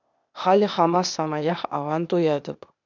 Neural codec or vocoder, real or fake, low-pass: codec, 16 kHz, 0.8 kbps, ZipCodec; fake; 7.2 kHz